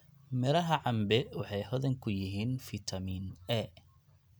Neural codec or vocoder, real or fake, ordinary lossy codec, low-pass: none; real; none; none